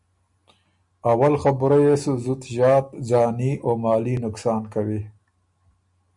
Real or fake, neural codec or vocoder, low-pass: real; none; 10.8 kHz